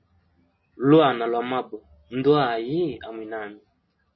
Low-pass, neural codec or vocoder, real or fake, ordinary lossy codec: 7.2 kHz; none; real; MP3, 24 kbps